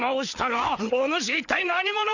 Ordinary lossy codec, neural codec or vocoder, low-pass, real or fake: none; codec, 16 kHz, 4 kbps, FreqCodec, larger model; 7.2 kHz; fake